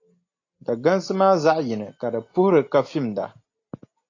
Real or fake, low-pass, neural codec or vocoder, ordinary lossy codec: real; 7.2 kHz; none; AAC, 32 kbps